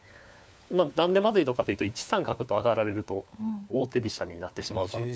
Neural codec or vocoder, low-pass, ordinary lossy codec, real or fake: codec, 16 kHz, 4 kbps, FunCodec, trained on LibriTTS, 50 frames a second; none; none; fake